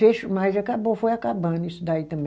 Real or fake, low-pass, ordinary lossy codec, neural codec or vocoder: real; none; none; none